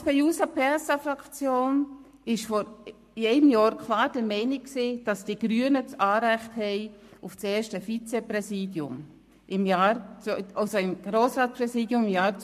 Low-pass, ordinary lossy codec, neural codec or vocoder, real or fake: 14.4 kHz; MP3, 64 kbps; codec, 44.1 kHz, 7.8 kbps, Pupu-Codec; fake